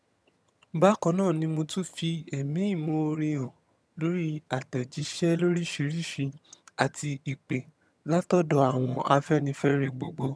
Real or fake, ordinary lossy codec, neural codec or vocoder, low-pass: fake; none; vocoder, 22.05 kHz, 80 mel bands, HiFi-GAN; none